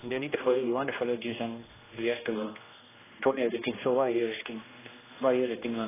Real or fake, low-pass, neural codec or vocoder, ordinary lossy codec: fake; 3.6 kHz; codec, 16 kHz, 1 kbps, X-Codec, HuBERT features, trained on general audio; AAC, 16 kbps